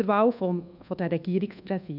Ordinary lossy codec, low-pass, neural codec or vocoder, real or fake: none; 5.4 kHz; codec, 24 kHz, 1.2 kbps, DualCodec; fake